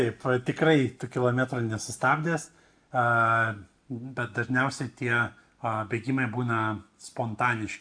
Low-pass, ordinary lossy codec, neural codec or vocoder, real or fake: 9.9 kHz; AAC, 64 kbps; none; real